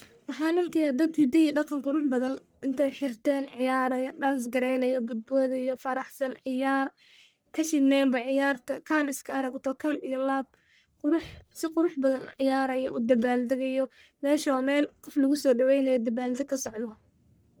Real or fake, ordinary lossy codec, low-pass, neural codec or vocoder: fake; none; none; codec, 44.1 kHz, 1.7 kbps, Pupu-Codec